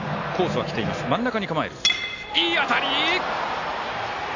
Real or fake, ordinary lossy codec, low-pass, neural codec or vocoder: real; AAC, 48 kbps; 7.2 kHz; none